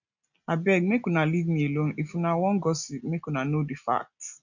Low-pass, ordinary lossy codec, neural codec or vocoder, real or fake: 7.2 kHz; none; none; real